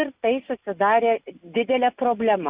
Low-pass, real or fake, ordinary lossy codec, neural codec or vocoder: 3.6 kHz; real; Opus, 64 kbps; none